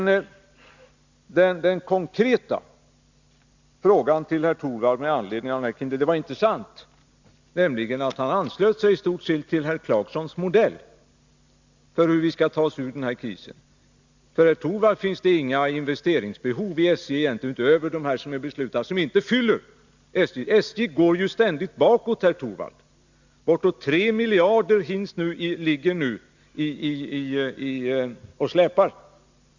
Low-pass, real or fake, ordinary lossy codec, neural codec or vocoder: 7.2 kHz; real; Opus, 64 kbps; none